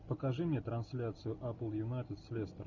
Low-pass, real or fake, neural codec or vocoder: 7.2 kHz; real; none